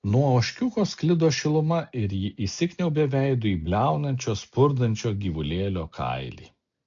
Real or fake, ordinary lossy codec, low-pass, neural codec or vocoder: real; AAC, 64 kbps; 7.2 kHz; none